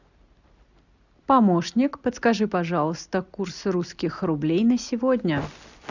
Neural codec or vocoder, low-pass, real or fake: none; 7.2 kHz; real